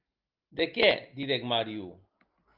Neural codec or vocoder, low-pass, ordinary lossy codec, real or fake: none; 5.4 kHz; Opus, 24 kbps; real